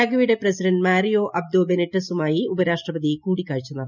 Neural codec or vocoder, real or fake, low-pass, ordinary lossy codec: none; real; 7.2 kHz; none